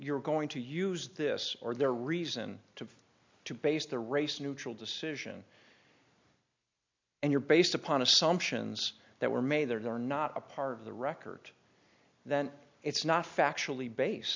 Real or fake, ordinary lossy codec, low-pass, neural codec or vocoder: real; MP3, 64 kbps; 7.2 kHz; none